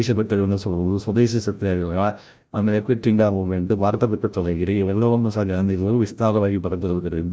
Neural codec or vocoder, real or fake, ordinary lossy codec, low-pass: codec, 16 kHz, 0.5 kbps, FreqCodec, larger model; fake; none; none